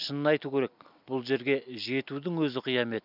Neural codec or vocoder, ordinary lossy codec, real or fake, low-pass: none; none; real; 5.4 kHz